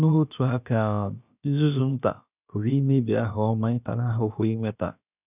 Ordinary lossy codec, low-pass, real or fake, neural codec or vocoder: none; 3.6 kHz; fake; codec, 16 kHz, about 1 kbps, DyCAST, with the encoder's durations